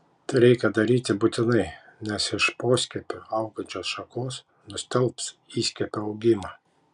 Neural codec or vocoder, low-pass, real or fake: none; 10.8 kHz; real